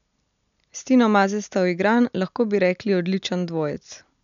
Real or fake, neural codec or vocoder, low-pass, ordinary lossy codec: real; none; 7.2 kHz; none